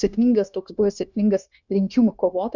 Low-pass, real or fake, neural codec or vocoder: 7.2 kHz; fake; codec, 16 kHz, 0.9 kbps, LongCat-Audio-Codec